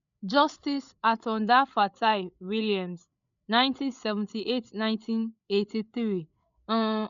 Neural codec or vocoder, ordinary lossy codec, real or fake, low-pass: codec, 16 kHz, 8 kbps, FreqCodec, larger model; none; fake; 7.2 kHz